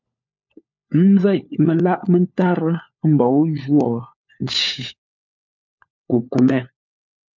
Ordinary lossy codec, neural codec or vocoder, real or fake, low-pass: MP3, 64 kbps; codec, 16 kHz, 4 kbps, FunCodec, trained on LibriTTS, 50 frames a second; fake; 7.2 kHz